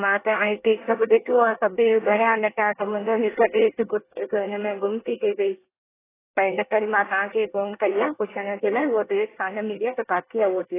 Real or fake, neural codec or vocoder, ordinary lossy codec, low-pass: fake; codec, 24 kHz, 1 kbps, SNAC; AAC, 16 kbps; 3.6 kHz